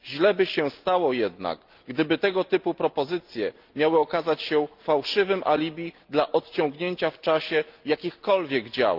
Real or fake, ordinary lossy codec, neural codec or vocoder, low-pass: real; Opus, 24 kbps; none; 5.4 kHz